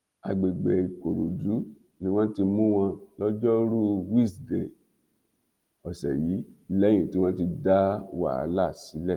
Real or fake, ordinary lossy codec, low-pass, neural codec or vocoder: real; Opus, 24 kbps; 19.8 kHz; none